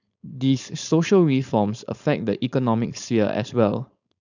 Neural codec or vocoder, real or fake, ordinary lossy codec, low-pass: codec, 16 kHz, 4.8 kbps, FACodec; fake; none; 7.2 kHz